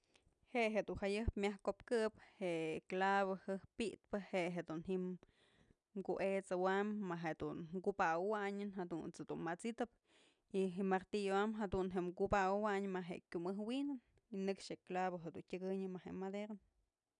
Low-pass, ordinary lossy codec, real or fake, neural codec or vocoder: 9.9 kHz; none; real; none